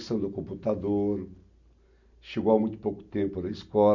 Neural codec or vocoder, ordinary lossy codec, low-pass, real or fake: none; none; 7.2 kHz; real